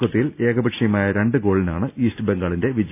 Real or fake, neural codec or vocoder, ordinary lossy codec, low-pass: real; none; none; 3.6 kHz